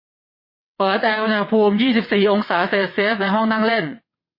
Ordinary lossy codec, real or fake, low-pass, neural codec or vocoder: MP3, 24 kbps; fake; 5.4 kHz; vocoder, 22.05 kHz, 80 mel bands, WaveNeXt